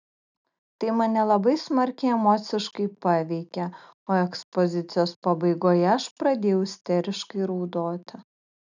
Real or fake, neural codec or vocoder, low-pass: real; none; 7.2 kHz